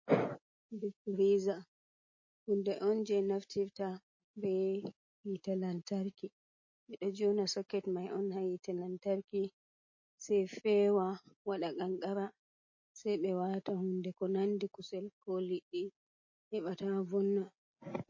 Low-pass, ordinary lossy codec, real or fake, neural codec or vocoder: 7.2 kHz; MP3, 32 kbps; real; none